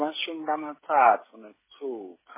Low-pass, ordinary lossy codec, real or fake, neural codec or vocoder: 3.6 kHz; MP3, 16 kbps; fake; codec, 16 kHz, 16 kbps, FreqCodec, smaller model